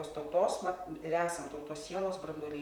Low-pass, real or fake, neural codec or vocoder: 19.8 kHz; fake; vocoder, 44.1 kHz, 128 mel bands, Pupu-Vocoder